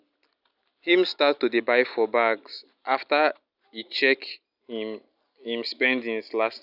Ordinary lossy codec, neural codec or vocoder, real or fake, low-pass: none; none; real; 5.4 kHz